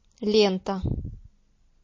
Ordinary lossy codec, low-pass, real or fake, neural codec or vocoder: MP3, 32 kbps; 7.2 kHz; real; none